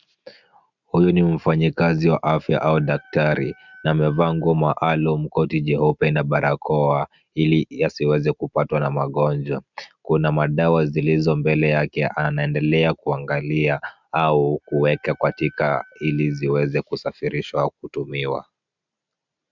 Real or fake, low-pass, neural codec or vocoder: real; 7.2 kHz; none